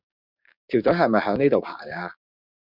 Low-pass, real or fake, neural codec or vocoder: 5.4 kHz; fake; codec, 16 kHz, 6 kbps, DAC